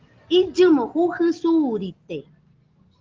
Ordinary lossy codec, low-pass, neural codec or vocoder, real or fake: Opus, 16 kbps; 7.2 kHz; none; real